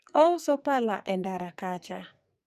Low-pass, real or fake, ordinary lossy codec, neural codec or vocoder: 14.4 kHz; fake; none; codec, 44.1 kHz, 2.6 kbps, SNAC